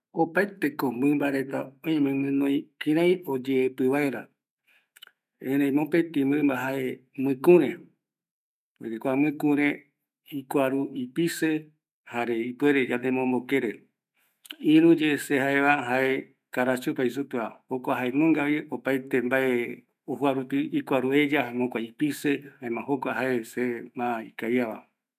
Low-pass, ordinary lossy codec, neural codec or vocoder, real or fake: 14.4 kHz; none; autoencoder, 48 kHz, 128 numbers a frame, DAC-VAE, trained on Japanese speech; fake